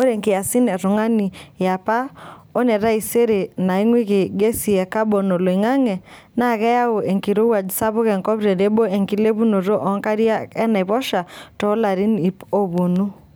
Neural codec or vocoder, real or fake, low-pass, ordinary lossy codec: none; real; none; none